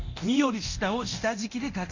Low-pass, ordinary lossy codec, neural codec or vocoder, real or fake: 7.2 kHz; none; codec, 24 kHz, 0.9 kbps, DualCodec; fake